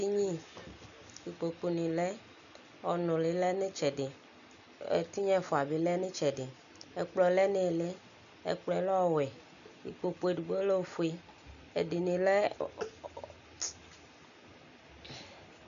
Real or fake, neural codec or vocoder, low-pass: real; none; 7.2 kHz